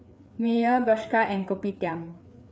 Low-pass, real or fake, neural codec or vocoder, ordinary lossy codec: none; fake; codec, 16 kHz, 4 kbps, FreqCodec, larger model; none